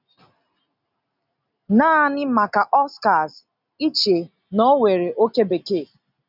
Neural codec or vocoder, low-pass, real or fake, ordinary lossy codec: none; 5.4 kHz; real; none